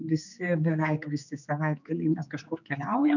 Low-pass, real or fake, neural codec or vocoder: 7.2 kHz; fake; codec, 16 kHz, 4 kbps, X-Codec, HuBERT features, trained on general audio